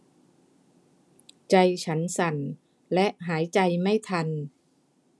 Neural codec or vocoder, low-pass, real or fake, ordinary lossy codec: none; none; real; none